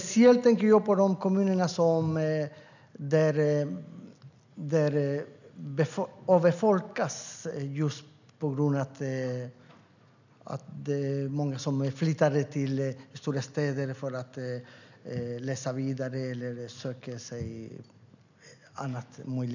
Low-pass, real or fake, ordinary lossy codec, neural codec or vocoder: 7.2 kHz; real; none; none